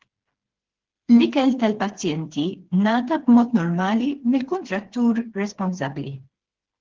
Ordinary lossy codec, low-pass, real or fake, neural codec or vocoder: Opus, 16 kbps; 7.2 kHz; fake; codec, 16 kHz, 4 kbps, FreqCodec, smaller model